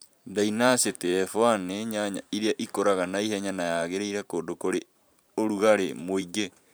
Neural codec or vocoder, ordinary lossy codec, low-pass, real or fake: vocoder, 44.1 kHz, 128 mel bands every 512 samples, BigVGAN v2; none; none; fake